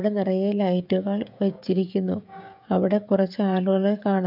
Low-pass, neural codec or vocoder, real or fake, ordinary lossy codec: 5.4 kHz; codec, 16 kHz, 16 kbps, FreqCodec, smaller model; fake; none